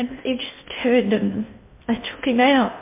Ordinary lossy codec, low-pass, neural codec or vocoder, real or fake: MP3, 32 kbps; 3.6 kHz; codec, 16 kHz in and 24 kHz out, 0.6 kbps, FocalCodec, streaming, 2048 codes; fake